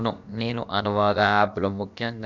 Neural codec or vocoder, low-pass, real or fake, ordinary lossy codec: codec, 16 kHz, about 1 kbps, DyCAST, with the encoder's durations; 7.2 kHz; fake; none